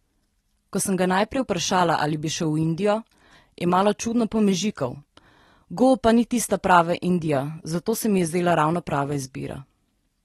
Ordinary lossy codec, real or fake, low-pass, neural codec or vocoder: AAC, 32 kbps; real; 19.8 kHz; none